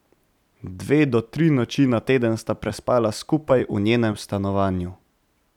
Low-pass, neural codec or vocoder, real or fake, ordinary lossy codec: 19.8 kHz; vocoder, 44.1 kHz, 128 mel bands every 256 samples, BigVGAN v2; fake; none